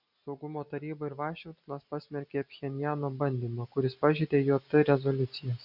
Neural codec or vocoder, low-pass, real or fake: none; 5.4 kHz; real